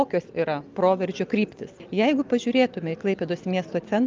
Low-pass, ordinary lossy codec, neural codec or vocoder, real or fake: 7.2 kHz; Opus, 24 kbps; none; real